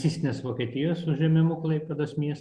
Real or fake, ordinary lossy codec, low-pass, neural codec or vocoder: real; Opus, 64 kbps; 9.9 kHz; none